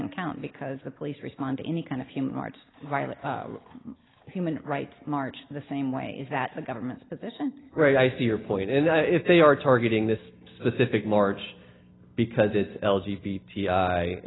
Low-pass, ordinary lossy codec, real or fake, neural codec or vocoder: 7.2 kHz; AAC, 16 kbps; real; none